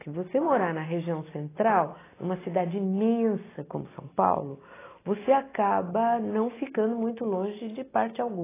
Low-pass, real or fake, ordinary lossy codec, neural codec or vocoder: 3.6 kHz; real; AAC, 16 kbps; none